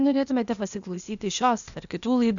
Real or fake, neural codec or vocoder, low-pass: fake; codec, 16 kHz, 0.8 kbps, ZipCodec; 7.2 kHz